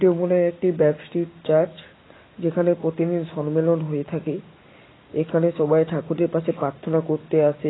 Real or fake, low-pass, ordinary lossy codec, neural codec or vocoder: real; 7.2 kHz; AAC, 16 kbps; none